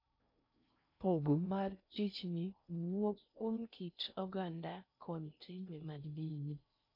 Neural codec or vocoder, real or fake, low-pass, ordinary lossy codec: codec, 16 kHz in and 24 kHz out, 0.6 kbps, FocalCodec, streaming, 2048 codes; fake; 5.4 kHz; none